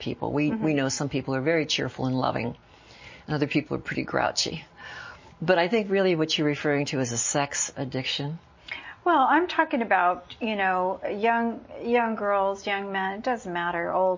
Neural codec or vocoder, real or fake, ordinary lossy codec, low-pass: none; real; MP3, 32 kbps; 7.2 kHz